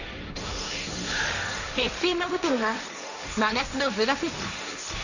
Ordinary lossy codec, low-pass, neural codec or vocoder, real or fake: none; 7.2 kHz; codec, 16 kHz, 1.1 kbps, Voila-Tokenizer; fake